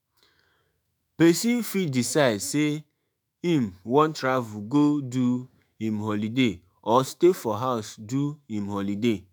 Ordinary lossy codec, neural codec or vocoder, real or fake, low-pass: none; autoencoder, 48 kHz, 128 numbers a frame, DAC-VAE, trained on Japanese speech; fake; none